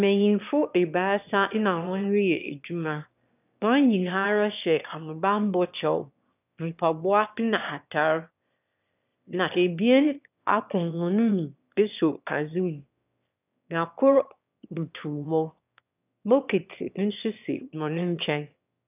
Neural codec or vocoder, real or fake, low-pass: autoencoder, 22.05 kHz, a latent of 192 numbers a frame, VITS, trained on one speaker; fake; 3.6 kHz